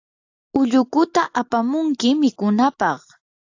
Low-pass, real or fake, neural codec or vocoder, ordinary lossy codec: 7.2 kHz; real; none; AAC, 48 kbps